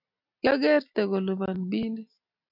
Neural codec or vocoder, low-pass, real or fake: none; 5.4 kHz; real